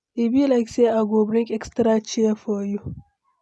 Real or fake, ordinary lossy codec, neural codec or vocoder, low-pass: real; none; none; none